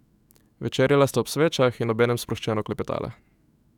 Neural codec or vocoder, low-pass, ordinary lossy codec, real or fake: autoencoder, 48 kHz, 128 numbers a frame, DAC-VAE, trained on Japanese speech; 19.8 kHz; none; fake